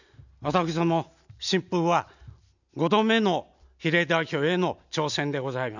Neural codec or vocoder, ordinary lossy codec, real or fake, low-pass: none; none; real; 7.2 kHz